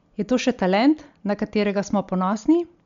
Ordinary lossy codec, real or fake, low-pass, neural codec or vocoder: MP3, 64 kbps; real; 7.2 kHz; none